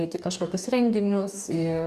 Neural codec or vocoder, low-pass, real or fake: codec, 44.1 kHz, 2.6 kbps, DAC; 14.4 kHz; fake